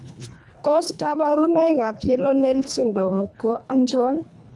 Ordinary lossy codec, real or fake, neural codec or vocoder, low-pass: none; fake; codec, 24 kHz, 1.5 kbps, HILCodec; none